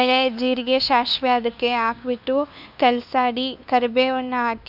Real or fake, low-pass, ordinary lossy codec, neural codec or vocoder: fake; 5.4 kHz; none; codec, 16 kHz, 2 kbps, FunCodec, trained on LibriTTS, 25 frames a second